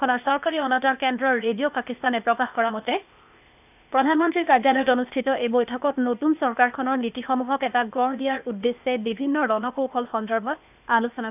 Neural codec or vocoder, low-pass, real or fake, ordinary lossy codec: codec, 16 kHz, 0.8 kbps, ZipCodec; 3.6 kHz; fake; none